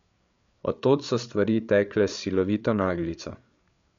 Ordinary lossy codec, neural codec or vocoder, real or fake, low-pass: MP3, 64 kbps; codec, 16 kHz, 8 kbps, FreqCodec, larger model; fake; 7.2 kHz